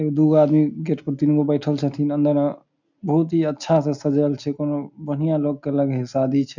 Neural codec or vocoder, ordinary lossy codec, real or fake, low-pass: none; none; real; 7.2 kHz